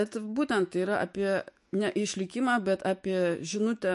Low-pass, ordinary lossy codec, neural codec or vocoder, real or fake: 14.4 kHz; MP3, 48 kbps; autoencoder, 48 kHz, 128 numbers a frame, DAC-VAE, trained on Japanese speech; fake